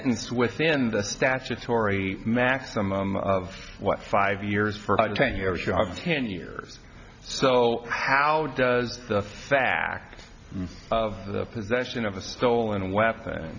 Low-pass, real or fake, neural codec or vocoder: 7.2 kHz; real; none